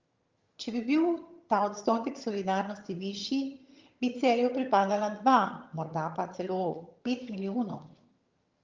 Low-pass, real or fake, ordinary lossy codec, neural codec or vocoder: 7.2 kHz; fake; Opus, 32 kbps; vocoder, 22.05 kHz, 80 mel bands, HiFi-GAN